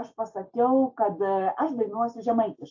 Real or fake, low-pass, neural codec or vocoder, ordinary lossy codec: real; 7.2 kHz; none; AAC, 48 kbps